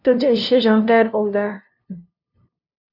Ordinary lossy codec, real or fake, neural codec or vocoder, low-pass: none; fake; codec, 16 kHz, 0.5 kbps, FunCodec, trained on LibriTTS, 25 frames a second; 5.4 kHz